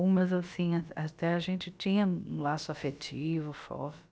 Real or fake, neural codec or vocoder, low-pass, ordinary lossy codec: fake; codec, 16 kHz, about 1 kbps, DyCAST, with the encoder's durations; none; none